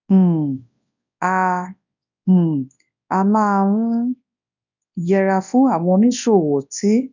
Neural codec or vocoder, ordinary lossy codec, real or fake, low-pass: codec, 24 kHz, 0.9 kbps, WavTokenizer, large speech release; none; fake; 7.2 kHz